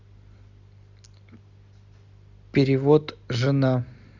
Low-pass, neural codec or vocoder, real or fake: 7.2 kHz; none; real